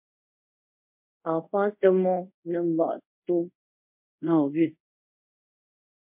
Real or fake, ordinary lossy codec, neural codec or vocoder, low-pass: fake; MP3, 32 kbps; codec, 24 kHz, 0.5 kbps, DualCodec; 3.6 kHz